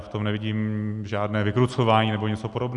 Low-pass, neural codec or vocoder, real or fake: 10.8 kHz; none; real